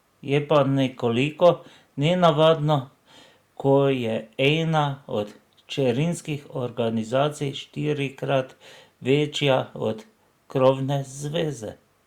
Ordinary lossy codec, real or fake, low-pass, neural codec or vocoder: Opus, 64 kbps; real; 19.8 kHz; none